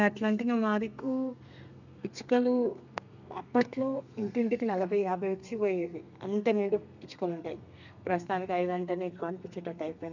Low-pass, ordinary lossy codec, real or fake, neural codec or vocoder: 7.2 kHz; none; fake; codec, 32 kHz, 1.9 kbps, SNAC